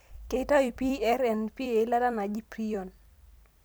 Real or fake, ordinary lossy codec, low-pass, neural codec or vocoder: fake; none; none; vocoder, 44.1 kHz, 128 mel bands every 256 samples, BigVGAN v2